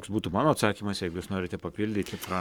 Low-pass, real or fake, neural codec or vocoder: 19.8 kHz; fake; codec, 44.1 kHz, 7.8 kbps, Pupu-Codec